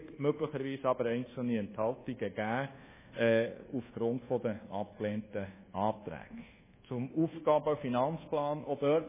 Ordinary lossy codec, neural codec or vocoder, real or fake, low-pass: MP3, 16 kbps; codec, 24 kHz, 1.2 kbps, DualCodec; fake; 3.6 kHz